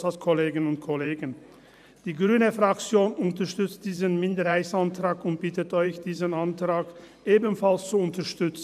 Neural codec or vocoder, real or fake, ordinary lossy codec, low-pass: vocoder, 44.1 kHz, 128 mel bands every 512 samples, BigVGAN v2; fake; none; 14.4 kHz